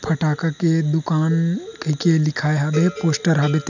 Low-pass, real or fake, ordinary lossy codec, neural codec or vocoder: 7.2 kHz; real; none; none